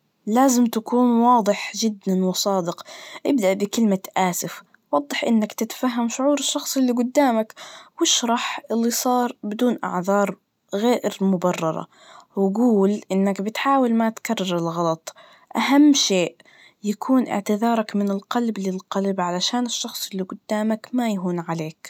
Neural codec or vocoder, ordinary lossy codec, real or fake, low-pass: none; none; real; 19.8 kHz